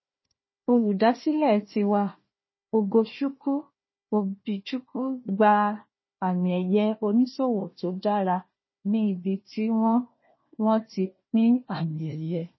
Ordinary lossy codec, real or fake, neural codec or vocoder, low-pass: MP3, 24 kbps; fake; codec, 16 kHz, 1 kbps, FunCodec, trained on Chinese and English, 50 frames a second; 7.2 kHz